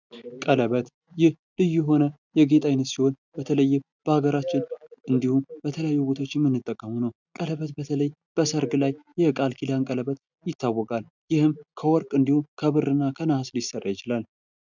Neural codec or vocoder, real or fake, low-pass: none; real; 7.2 kHz